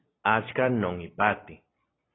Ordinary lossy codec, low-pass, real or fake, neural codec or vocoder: AAC, 16 kbps; 7.2 kHz; real; none